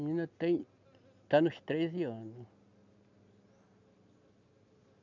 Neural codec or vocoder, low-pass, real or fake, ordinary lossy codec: none; 7.2 kHz; real; none